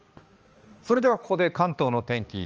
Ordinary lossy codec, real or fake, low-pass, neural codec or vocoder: Opus, 24 kbps; fake; 7.2 kHz; codec, 16 kHz, 2 kbps, X-Codec, HuBERT features, trained on balanced general audio